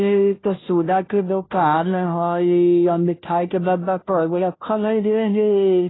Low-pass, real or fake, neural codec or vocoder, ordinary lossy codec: 7.2 kHz; fake; codec, 16 kHz, 0.5 kbps, FunCodec, trained on Chinese and English, 25 frames a second; AAC, 16 kbps